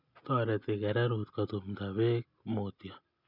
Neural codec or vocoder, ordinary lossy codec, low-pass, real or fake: none; none; 5.4 kHz; real